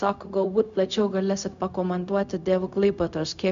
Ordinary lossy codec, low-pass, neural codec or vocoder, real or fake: MP3, 64 kbps; 7.2 kHz; codec, 16 kHz, 0.4 kbps, LongCat-Audio-Codec; fake